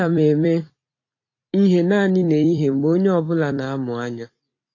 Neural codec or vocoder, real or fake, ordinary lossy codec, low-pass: vocoder, 44.1 kHz, 128 mel bands every 256 samples, BigVGAN v2; fake; AAC, 32 kbps; 7.2 kHz